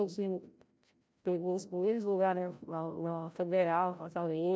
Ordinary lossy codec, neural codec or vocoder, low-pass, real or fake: none; codec, 16 kHz, 0.5 kbps, FreqCodec, larger model; none; fake